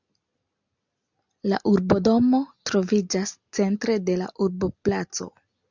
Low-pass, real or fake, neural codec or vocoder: 7.2 kHz; real; none